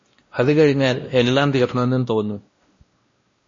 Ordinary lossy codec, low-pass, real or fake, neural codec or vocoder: MP3, 32 kbps; 7.2 kHz; fake; codec, 16 kHz, 1 kbps, X-Codec, WavLM features, trained on Multilingual LibriSpeech